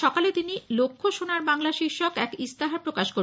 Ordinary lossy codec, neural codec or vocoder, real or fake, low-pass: none; none; real; none